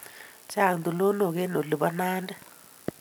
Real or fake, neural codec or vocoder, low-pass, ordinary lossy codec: real; none; none; none